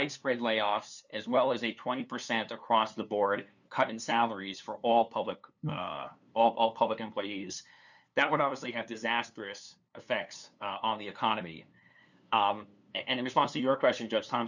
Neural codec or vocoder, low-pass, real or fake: codec, 16 kHz, 2 kbps, FunCodec, trained on LibriTTS, 25 frames a second; 7.2 kHz; fake